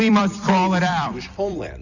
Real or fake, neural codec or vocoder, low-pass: fake; vocoder, 44.1 kHz, 128 mel bands every 256 samples, BigVGAN v2; 7.2 kHz